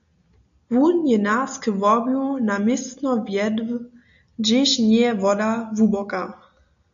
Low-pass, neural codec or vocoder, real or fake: 7.2 kHz; none; real